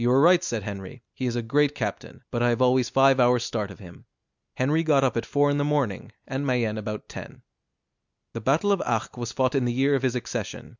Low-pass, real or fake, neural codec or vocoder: 7.2 kHz; real; none